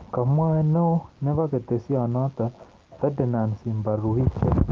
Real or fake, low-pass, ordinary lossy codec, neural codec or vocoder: real; 7.2 kHz; Opus, 16 kbps; none